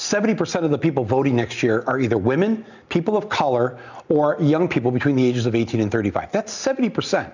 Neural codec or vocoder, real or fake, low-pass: none; real; 7.2 kHz